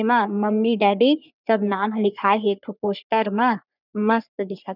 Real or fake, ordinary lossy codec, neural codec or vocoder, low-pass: fake; none; codec, 44.1 kHz, 3.4 kbps, Pupu-Codec; 5.4 kHz